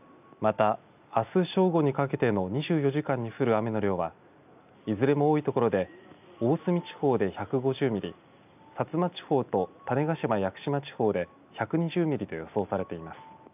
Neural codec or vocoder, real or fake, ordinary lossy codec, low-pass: none; real; none; 3.6 kHz